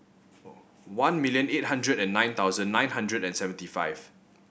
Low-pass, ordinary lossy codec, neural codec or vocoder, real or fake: none; none; none; real